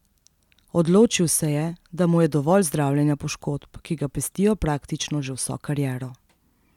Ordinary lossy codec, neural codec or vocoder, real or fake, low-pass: none; none; real; 19.8 kHz